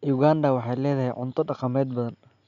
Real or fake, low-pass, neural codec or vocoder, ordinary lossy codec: real; 7.2 kHz; none; none